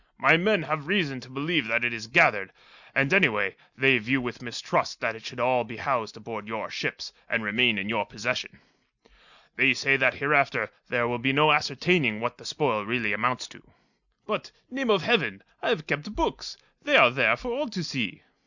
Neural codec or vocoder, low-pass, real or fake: none; 7.2 kHz; real